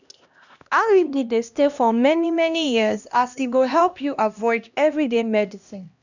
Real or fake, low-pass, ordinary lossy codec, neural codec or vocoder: fake; 7.2 kHz; none; codec, 16 kHz, 1 kbps, X-Codec, HuBERT features, trained on LibriSpeech